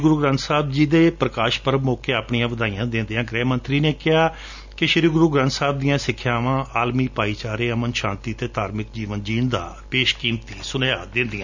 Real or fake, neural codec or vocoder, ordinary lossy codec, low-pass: real; none; none; 7.2 kHz